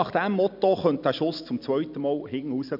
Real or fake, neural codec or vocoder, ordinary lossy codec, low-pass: real; none; none; 5.4 kHz